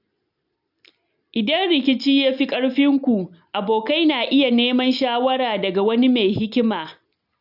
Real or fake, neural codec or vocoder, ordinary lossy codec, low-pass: real; none; none; 5.4 kHz